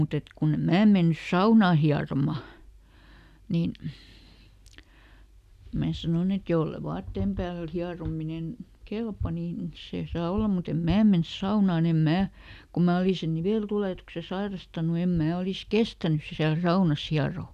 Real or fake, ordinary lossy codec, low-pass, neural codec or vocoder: real; none; 14.4 kHz; none